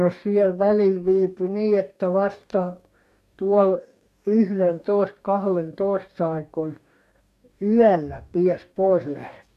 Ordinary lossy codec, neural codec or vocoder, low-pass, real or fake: none; codec, 44.1 kHz, 2.6 kbps, DAC; 14.4 kHz; fake